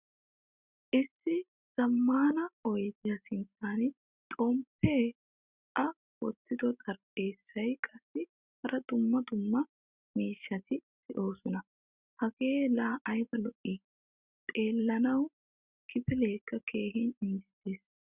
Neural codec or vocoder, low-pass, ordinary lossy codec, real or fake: none; 3.6 kHz; Opus, 64 kbps; real